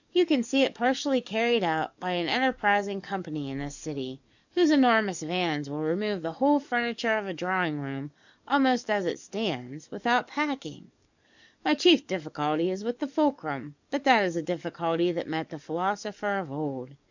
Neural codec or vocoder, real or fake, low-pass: codec, 44.1 kHz, 7.8 kbps, DAC; fake; 7.2 kHz